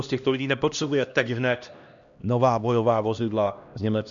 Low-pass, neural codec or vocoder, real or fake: 7.2 kHz; codec, 16 kHz, 1 kbps, X-Codec, HuBERT features, trained on LibriSpeech; fake